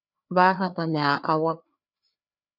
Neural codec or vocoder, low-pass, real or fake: codec, 16 kHz, 2 kbps, FreqCodec, larger model; 5.4 kHz; fake